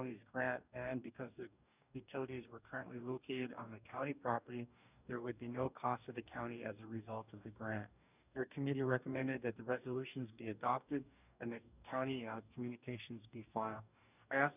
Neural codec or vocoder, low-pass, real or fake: codec, 44.1 kHz, 2.6 kbps, DAC; 3.6 kHz; fake